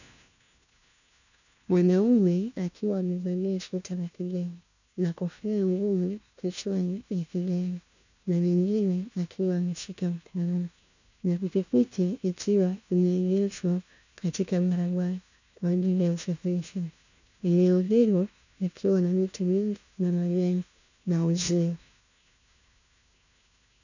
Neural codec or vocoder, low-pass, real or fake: codec, 16 kHz, 1 kbps, FunCodec, trained on LibriTTS, 50 frames a second; 7.2 kHz; fake